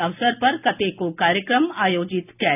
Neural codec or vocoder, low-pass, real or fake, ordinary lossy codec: none; 3.6 kHz; real; none